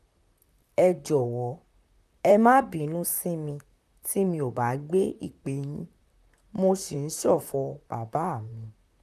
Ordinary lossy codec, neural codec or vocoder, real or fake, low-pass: none; vocoder, 44.1 kHz, 128 mel bands, Pupu-Vocoder; fake; 14.4 kHz